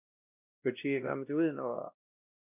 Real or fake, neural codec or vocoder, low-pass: fake; codec, 16 kHz, 1 kbps, X-Codec, WavLM features, trained on Multilingual LibriSpeech; 3.6 kHz